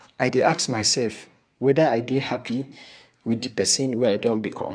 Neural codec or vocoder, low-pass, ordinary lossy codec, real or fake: codec, 24 kHz, 1 kbps, SNAC; 9.9 kHz; none; fake